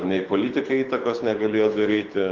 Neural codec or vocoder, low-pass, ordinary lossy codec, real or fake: codec, 24 kHz, 6 kbps, HILCodec; 7.2 kHz; Opus, 24 kbps; fake